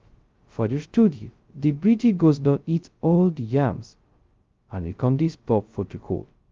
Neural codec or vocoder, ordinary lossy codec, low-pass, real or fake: codec, 16 kHz, 0.2 kbps, FocalCodec; Opus, 32 kbps; 7.2 kHz; fake